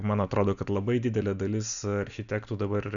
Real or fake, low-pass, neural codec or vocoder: real; 7.2 kHz; none